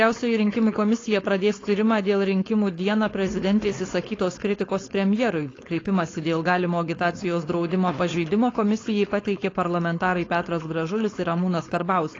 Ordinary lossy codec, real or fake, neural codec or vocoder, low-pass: AAC, 32 kbps; fake; codec, 16 kHz, 4.8 kbps, FACodec; 7.2 kHz